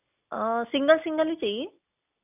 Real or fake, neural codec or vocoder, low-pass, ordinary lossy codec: real; none; 3.6 kHz; none